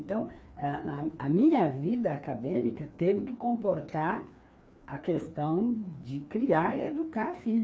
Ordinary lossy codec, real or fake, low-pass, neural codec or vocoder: none; fake; none; codec, 16 kHz, 2 kbps, FreqCodec, larger model